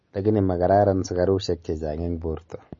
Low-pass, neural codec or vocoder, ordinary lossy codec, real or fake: 7.2 kHz; none; MP3, 32 kbps; real